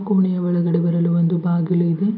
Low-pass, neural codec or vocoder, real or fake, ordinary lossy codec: 5.4 kHz; none; real; none